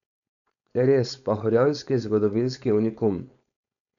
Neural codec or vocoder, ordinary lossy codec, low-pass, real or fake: codec, 16 kHz, 4.8 kbps, FACodec; none; 7.2 kHz; fake